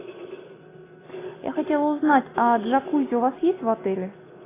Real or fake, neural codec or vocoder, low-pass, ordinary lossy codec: real; none; 3.6 kHz; AAC, 16 kbps